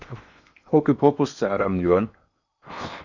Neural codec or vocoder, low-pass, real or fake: codec, 16 kHz in and 24 kHz out, 0.8 kbps, FocalCodec, streaming, 65536 codes; 7.2 kHz; fake